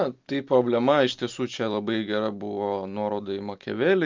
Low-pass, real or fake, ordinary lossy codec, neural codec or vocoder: 7.2 kHz; real; Opus, 24 kbps; none